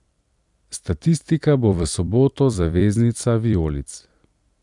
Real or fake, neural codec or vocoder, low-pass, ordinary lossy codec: fake; vocoder, 44.1 kHz, 128 mel bands every 256 samples, BigVGAN v2; 10.8 kHz; none